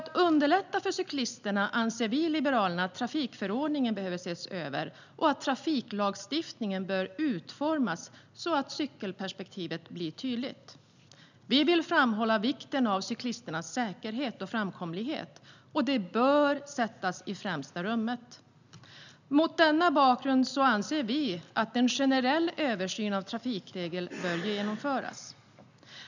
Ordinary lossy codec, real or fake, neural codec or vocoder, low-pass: none; real; none; 7.2 kHz